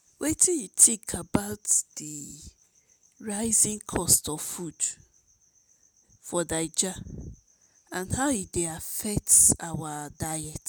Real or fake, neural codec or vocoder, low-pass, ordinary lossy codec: real; none; none; none